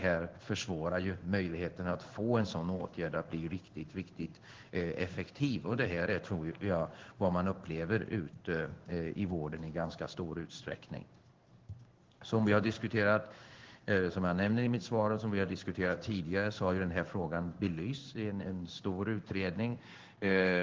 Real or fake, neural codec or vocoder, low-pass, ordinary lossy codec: fake; codec, 16 kHz in and 24 kHz out, 1 kbps, XY-Tokenizer; 7.2 kHz; Opus, 16 kbps